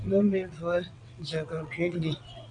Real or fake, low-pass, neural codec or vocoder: fake; 9.9 kHz; vocoder, 22.05 kHz, 80 mel bands, WaveNeXt